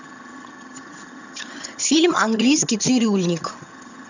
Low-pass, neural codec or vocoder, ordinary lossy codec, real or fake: 7.2 kHz; vocoder, 22.05 kHz, 80 mel bands, HiFi-GAN; none; fake